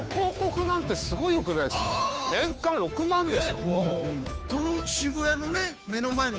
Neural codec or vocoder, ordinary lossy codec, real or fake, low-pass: codec, 16 kHz, 2 kbps, FunCodec, trained on Chinese and English, 25 frames a second; none; fake; none